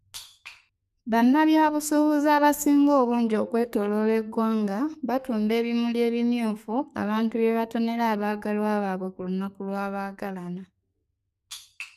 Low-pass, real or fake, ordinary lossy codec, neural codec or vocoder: 14.4 kHz; fake; none; codec, 32 kHz, 1.9 kbps, SNAC